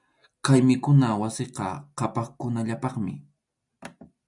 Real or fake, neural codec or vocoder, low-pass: real; none; 10.8 kHz